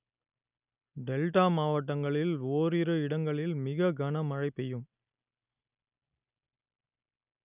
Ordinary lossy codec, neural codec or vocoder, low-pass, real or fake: none; none; 3.6 kHz; real